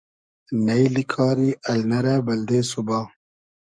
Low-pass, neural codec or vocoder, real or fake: 9.9 kHz; codec, 44.1 kHz, 7.8 kbps, DAC; fake